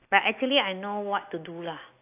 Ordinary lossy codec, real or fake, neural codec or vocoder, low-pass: AAC, 32 kbps; real; none; 3.6 kHz